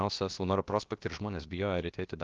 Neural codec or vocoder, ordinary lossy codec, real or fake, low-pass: codec, 16 kHz, about 1 kbps, DyCAST, with the encoder's durations; Opus, 32 kbps; fake; 7.2 kHz